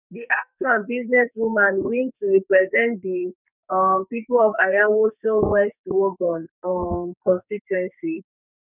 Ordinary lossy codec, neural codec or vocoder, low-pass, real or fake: none; codec, 44.1 kHz, 2.6 kbps, SNAC; 3.6 kHz; fake